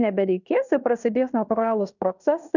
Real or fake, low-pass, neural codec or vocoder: fake; 7.2 kHz; codec, 16 kHz in and 24 kHz out, 0.9 kbps, LongCat-Audio-Codec, fine tuned four codebook decoder